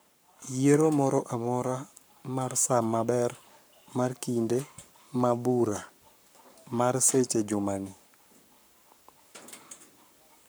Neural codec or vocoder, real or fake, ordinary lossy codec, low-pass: codec, 44.1 kHz, 7.8 kbps, Pupu-Codec; fake; none; none